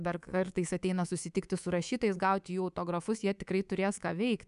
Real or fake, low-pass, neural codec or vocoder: fake; 10.8 kHz; codec, 24 kHz, 3.1 kbps, DualCodec